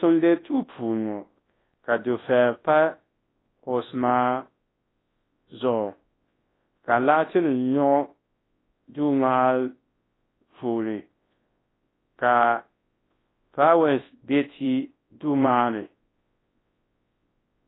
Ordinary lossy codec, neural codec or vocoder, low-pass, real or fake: AAC, 16 kbps; codec, 24 kHz, 0.9 kbps, WavTokenizer, large speech release; 7.2 kHz; fake